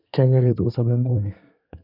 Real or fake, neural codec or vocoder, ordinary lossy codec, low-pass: fake; codec, 24 kHz, 1 kbps, SNAC; none; 5.4 kHz